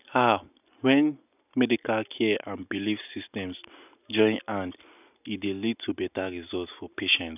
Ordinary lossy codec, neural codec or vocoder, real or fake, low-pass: none; none; real; 3.6 kHz